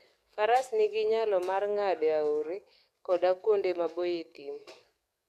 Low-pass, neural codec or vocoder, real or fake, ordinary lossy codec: 14.4 kHz; codec, 44.1 kHz, 7.8 kbps, DAC; fake; none